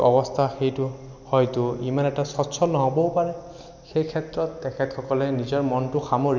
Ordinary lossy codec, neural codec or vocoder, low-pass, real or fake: none; none; 7.2 kHz; real